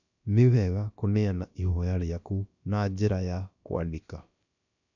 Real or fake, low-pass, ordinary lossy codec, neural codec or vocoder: fake; 7.2 kHz; none; codec, 16 kHz, about 1 kbps, DyCAST, with the encoder's durations